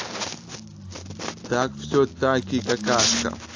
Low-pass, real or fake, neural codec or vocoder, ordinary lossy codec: 7.2 kHz; real; none; AAC, 48 kbps